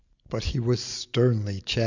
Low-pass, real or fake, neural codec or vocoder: 7.2 kHz; real; none